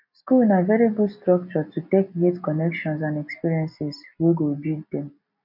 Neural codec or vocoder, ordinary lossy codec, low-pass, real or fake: none; none; 5.4 kHz; real